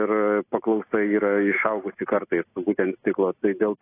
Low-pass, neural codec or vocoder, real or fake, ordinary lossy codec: 3.6 kHz; none; real; AAC, 24 kbps